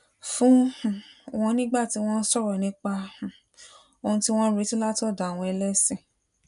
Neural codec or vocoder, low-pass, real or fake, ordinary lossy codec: none; 10.8 kHz; real; none